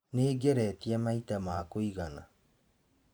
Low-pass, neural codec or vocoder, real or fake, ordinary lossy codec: none; vocoder, 44.1 kHz, 128 mel bands every 512 samples, BigVGAN v2; fake; none